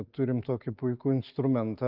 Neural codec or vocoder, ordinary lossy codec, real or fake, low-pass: none; Opus, 32 kbps; real; 5.4 kHz